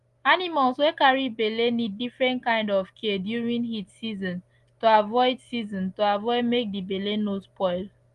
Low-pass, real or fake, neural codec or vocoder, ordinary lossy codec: 10.8 kHz; real; none; Opus, 24 kbps